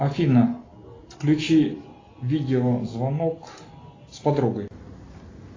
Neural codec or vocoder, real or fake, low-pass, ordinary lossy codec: none; real; 7.2 kHz; AAC, 32 kbps